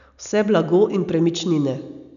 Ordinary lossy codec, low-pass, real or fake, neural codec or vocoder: none; 7.2 kHz; real; none